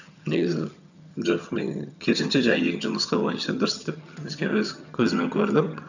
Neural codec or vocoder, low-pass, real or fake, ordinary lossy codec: vocoder, 22.05 kHz, 80 mel bands, HiFi-GAN; 7.2 kHz; fake; none